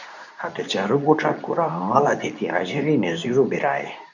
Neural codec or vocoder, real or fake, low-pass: codec, 24 kHz, 0.9 kbps, WavTokenizer, medium speech release version 2; fake; 7.2 kHz